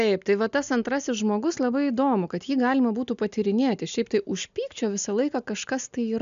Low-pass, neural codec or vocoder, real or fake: 7.2 kHz; none; real